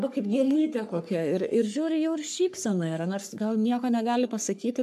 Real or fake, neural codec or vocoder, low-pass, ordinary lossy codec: fake; codec, 44.1 kHz, 3.4 kbps, Pupu-Codec; 14.4 kHz; AAC, 96 kbps